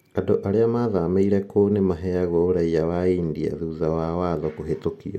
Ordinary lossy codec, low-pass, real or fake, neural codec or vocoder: MP3, 64 kbps; 19.8 kHz; real; none